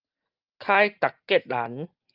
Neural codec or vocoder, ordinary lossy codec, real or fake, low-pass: none; Opus, 32 kbps; real; 5.4 kHz